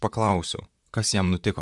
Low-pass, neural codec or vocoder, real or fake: 10.8 kHz; vocoder, 44.1 kHz, 128 mel bands, Pupu-Vocoder; fake